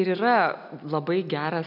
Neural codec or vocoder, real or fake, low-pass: none; real; 5.4 kHz